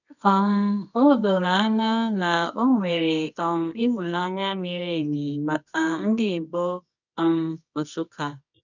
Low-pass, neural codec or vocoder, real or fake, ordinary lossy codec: 7.2 kHz; codec, 24 kHz, 0.9 kbps, WavTokenizer, medium music audio release; fake; none